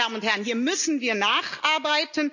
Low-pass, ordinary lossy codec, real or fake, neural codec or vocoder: 7.2 kHz; none; real; none